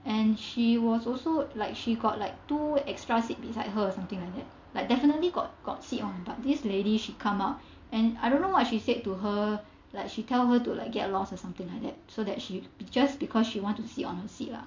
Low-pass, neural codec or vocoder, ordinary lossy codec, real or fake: 7.2 kHz; none; MP3, 48 kbps; real